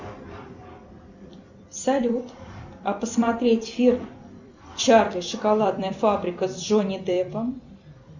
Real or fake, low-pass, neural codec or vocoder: fake; 7.2 kHz; vocoder, 44.1 kHz, 128 mel bands every 256 samples, BigVGAN v2